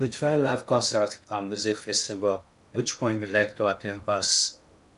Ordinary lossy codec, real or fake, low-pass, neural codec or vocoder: none; fake; 10.8 kHz; codec, 16 kHz in and 24 kHz out, 0.6 kbps, FocalCodec, streaming, 2048 codes